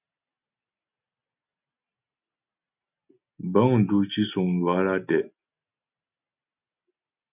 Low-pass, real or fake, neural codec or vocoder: 3.6 kHz; real; none